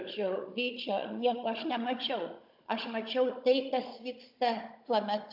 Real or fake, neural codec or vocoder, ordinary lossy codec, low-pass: fake; codec, 16 kHz, 16 kbps, FunCodec, trained on Chinese and English, 50 frames a second; AAC, 48 kbps; 5.4 kHz